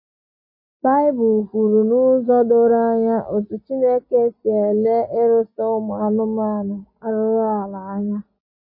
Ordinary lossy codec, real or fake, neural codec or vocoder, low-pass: MP3, 24 kbps; real; none; 5.4 kHz